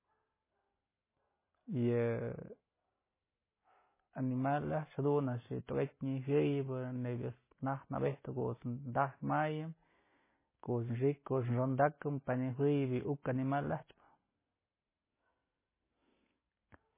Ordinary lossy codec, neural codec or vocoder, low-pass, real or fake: MP3, 16 kbps; none; 3.6 kHz; real